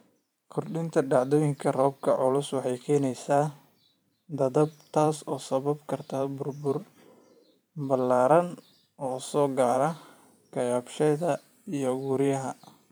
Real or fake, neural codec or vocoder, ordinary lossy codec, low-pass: fake; vocoder, 44.1 kHz, 128 mel bands every 512 samples, BigVGAN v2; none; none